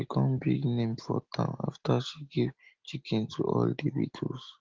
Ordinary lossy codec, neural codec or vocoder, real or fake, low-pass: Opus, 16 kbps; none; real; 7.2 kHz